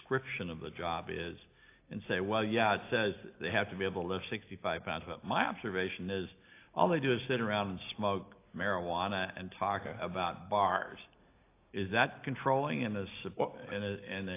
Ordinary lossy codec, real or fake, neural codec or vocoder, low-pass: AAC, 24 kbps; real; none; 3.6 kHz